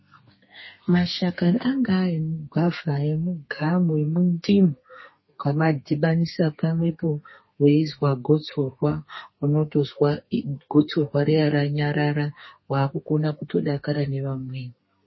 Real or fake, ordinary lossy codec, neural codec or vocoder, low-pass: fake; MP3, 24 kbps; codec, 32 kHz, 1.9 kbps, SNAC; 7.2 kHz